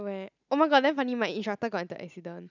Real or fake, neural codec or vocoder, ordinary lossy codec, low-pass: real; none; none; 7.2 kHz